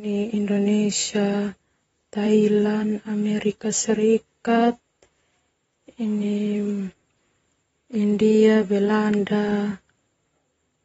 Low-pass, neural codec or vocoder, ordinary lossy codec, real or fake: 19.8 kHz; vocoder, 44.1 kHz, 128 mel bands, Pupu-Vocoder; AAC, 24 kbps; fake